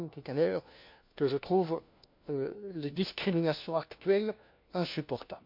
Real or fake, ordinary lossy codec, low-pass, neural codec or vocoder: fake; MP3, 48 kbps; 5.4 kHz; codec, 16 kHz, 1 kbps, FunCodec, trained on LibriTTS, 50 frames a second